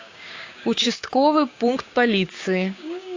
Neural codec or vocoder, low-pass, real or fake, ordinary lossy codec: codec, 16 kHz, 6 kbps, DAC; 7.2 kHz; fake; AAC, 48 kbps